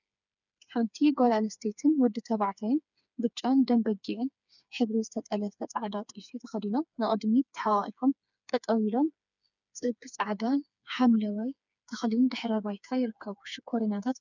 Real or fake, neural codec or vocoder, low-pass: fake; codec, 16 kHz, 4 kbps, FreqCodec, smaller model; 7.2 kHz